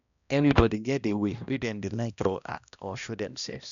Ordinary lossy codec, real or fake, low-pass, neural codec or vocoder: none; fake; 7.2 kHz; codec, 16 kHz, 1 kbps, X-Codec, HuBERT features, trained on balanced general audio